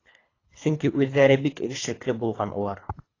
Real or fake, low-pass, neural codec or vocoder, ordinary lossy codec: fake; 7.2 kHz; codec, 24 kHz, 3 kbps, HILCodec; AAC, 32 kbps